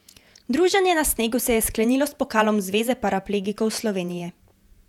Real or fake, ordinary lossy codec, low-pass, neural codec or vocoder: fake; none; 19.8 kHz; vocoder, 48 kHz, 128 mel bands, Vocos